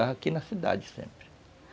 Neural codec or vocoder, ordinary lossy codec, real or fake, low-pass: none; none; real; none